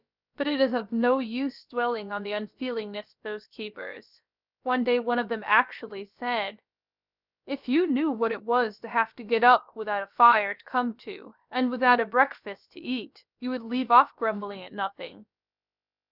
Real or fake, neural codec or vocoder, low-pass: fake; codec, 16 kHz, about 1 kbps, DyCAST, with the encoder's durations; 5.4 kHz